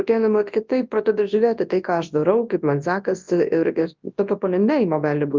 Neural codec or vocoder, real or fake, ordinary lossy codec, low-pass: codec, 24 kHz, 0.9 kbps, WavTokenizer, large speech release; fake; Opus, 24 kbps; 7.2 kHz